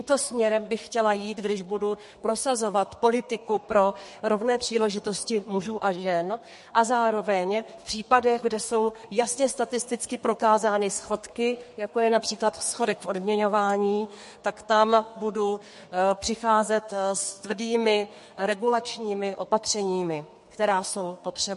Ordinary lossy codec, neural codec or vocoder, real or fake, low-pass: MP3, 48 kbps; codec, 32 kHz, 1.9 kbps, SNAC; fake; 14.4 kHz